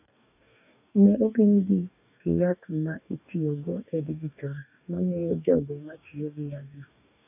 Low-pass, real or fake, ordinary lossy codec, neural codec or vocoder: 3.6 kHz; fake; none; codec, 44.1 kHz, 2.6 kbps, DAC